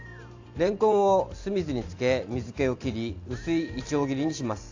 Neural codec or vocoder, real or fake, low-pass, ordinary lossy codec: vocoder, 44.1 kHz, 128 mel bands every 512 samples, BigVGAN v2; fake; 7.2 kHz; AAC, 48 kbps